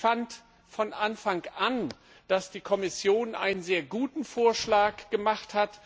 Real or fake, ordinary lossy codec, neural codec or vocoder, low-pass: real; none; none; none